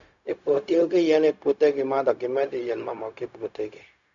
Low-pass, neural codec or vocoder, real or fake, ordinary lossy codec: 7.2 kHz; codec, 16 kHz, 0.4 kbps, LongCat-Audio-Codec; fake; none